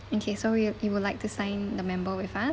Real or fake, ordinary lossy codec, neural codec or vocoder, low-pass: real; none; none; none